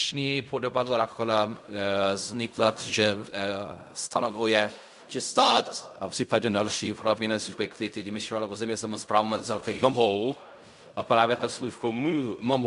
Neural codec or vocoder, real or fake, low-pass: codec, 16 kHz in and 24 kHz out, 0.4 kbps, LongCat-Audio-Codec, fine tuned four codebook decoder; fake; 10.8 kHz